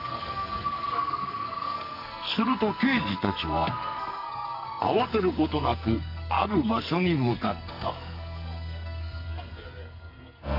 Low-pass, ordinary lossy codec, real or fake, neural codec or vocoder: 5.4 kHz; none; fake; codec, 44.1 kHz, 2.6 kbps, SNAC